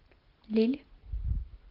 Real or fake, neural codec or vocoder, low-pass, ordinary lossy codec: real; none; 5.4 kHz; Opus, 16 kbps